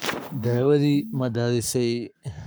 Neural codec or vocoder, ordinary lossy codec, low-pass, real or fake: codec, 44.1 kHz, 7.8 kbps, Pupu-Codec; none; none; fake